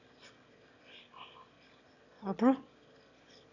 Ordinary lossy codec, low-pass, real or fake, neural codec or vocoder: none; 7.2 kHz; fake; autoencoder, 22.05 kHz, a latent of 192 numbers a frame, VITS, trained on one speaker